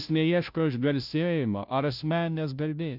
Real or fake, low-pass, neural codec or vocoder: fake; 5.4 kHz; codec, 16 kHz, 0.5 kbps, FunCodec, trained on Chinese and English, 25 frames a second